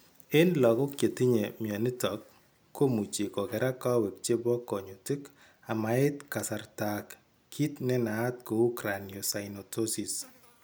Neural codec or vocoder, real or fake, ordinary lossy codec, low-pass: none; real; none; none